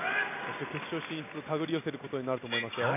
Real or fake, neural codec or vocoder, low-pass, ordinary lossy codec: real; none; 3.6 kHz; none